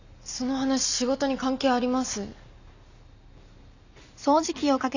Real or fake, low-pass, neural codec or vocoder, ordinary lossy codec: real; 7.2 kHz; none; Opus, 64 kbps